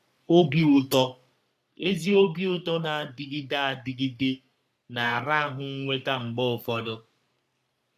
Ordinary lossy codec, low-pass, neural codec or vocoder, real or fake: none; 14.4 kHz; codec, 44.1 kHz, 3.4 kbps, Pupu-Codec; fake